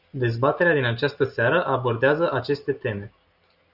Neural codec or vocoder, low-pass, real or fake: none; 5.4 kHz; real